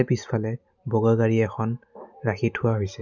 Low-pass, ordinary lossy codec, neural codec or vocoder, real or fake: 7.2 kHz; none; none; real